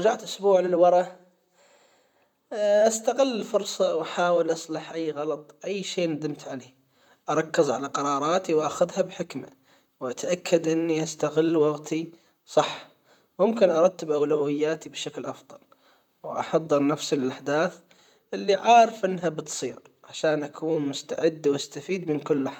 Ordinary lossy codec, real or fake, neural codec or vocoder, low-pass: none; fake; vocoder, 44.1 kHz, 128 mel bands, Pupu-Vocoder; 19.8 kHz